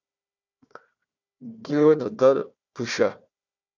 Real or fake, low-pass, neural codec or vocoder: fake; 7.2 kHz; codec, 16 kHz, 1 kbps, FunCodec, trained on Chinese and English, 50 frames a second